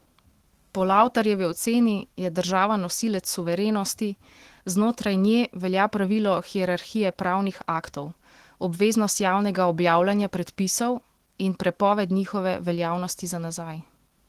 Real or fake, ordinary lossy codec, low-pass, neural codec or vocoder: fake; Opus, 16 kbps; 14.4 kHz; autoencoder, 48 kHz, 128 numbers a frame, DAC-VAE, trained on Japanese speech